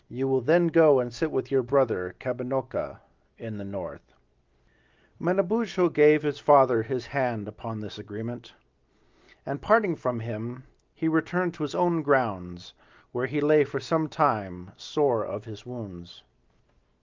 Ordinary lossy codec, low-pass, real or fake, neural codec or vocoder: Opus, 32 kbps; 7.2 kHz; real; none